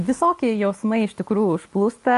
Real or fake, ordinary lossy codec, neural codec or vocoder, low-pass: real; MP3, 48 kbps; none; 14.4 kHz